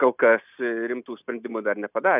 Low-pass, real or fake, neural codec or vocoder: 3.6 kHz; real; none